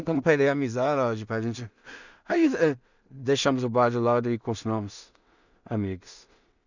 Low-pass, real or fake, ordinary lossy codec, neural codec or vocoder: 7.2 kHz; fake; none; codec, 16 kHz in and 24 kHz out, 0.4 kbps, LongCat-Audio-Codec, two codebook decoder